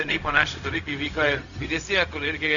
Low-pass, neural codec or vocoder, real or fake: 7.2 kHz; codec, 16 kHz, 0.4 kbps, LongCat-Audio-Codec; fake